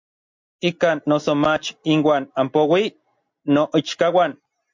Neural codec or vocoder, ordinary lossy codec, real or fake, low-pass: none; MP3, 48 kbps; real; 7.2 kHz